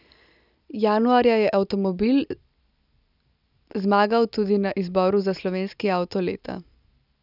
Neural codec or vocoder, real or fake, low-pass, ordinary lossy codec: none; real; 5.4 kHz; none